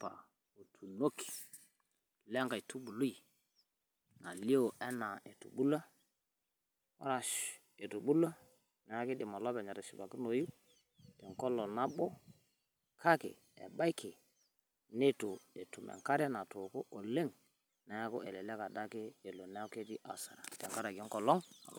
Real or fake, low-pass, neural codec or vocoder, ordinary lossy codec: real; none; none; none